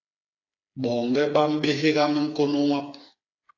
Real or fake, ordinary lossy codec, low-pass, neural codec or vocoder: fake; AAC, 48 kbps; 7.2 kHz; codec, 16 kHz, 4 kbps, FreqCodec, smaller model